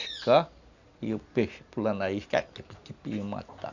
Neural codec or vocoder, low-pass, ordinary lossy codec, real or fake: none; 7.2 kHz; none; real